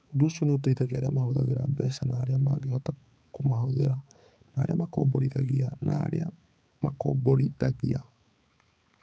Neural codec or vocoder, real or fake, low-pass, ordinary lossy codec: codec, 16 kHz, 4 kbps, X-Codec, HuBERT features, trained on balanced general audio; fake; none; none